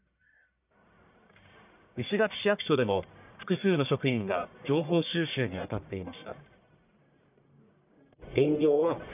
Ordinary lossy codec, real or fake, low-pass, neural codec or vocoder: none; fake; 3.6 kHz; codec, 44.1 kHz, 1.7 kbps, Pupu-Codec